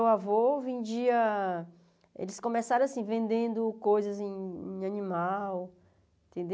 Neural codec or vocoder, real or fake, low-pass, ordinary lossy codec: none; real; none; none